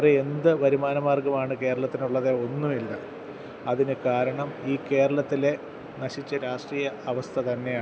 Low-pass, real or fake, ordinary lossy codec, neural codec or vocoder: none; real; none; none